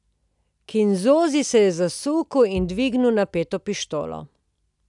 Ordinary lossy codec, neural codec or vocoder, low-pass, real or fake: none; none; 10.8 kHz; real